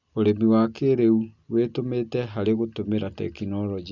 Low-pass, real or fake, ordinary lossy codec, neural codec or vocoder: 7.2 kHz; real; none; none